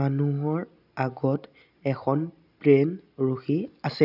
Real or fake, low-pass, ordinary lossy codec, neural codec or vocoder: real; 5.4 kHz; none; none